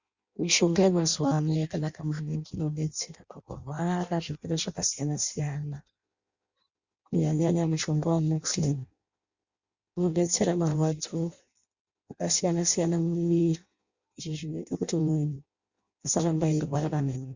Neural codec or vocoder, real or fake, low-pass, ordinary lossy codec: codec, 16 kHz in and 24 kHz out, 0.6 kbps, FireRedTTS-2 codec; fake; 7.2 kHz; Opus, 64 kbps